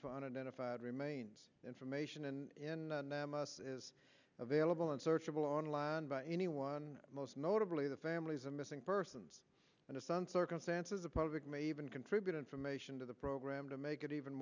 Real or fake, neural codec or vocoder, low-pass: real; none; 7.2 kHz